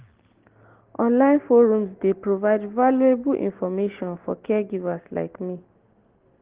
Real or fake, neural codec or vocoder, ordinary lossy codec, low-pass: real; none; Opus, 16 kbps; 3.6 kHz